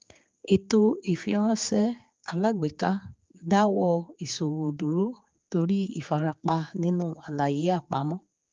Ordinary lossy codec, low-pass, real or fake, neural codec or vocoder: Opus, 32 kbps; 7.2 kHz; fake; codec, 16 kHz, 4 kbps, X-Codec, HuBERT features, trained on general audio